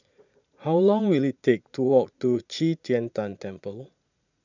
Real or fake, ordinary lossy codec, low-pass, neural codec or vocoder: fake; none; 7.2 kHz; vocoder, 44.1 kHz, 80 mel bands, Vocos